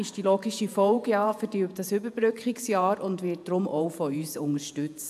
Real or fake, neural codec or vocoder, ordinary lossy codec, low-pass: fake; vocoder, 48 kHz, 128 mel bands, Vocos; none; 14.4 kHz